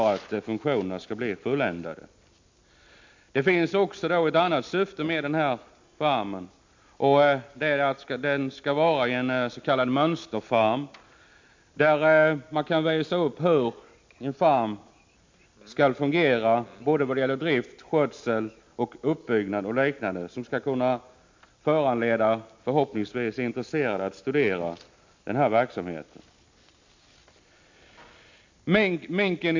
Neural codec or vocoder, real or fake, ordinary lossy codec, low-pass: none; real; MP3, 48 kbps; 7.2 kHz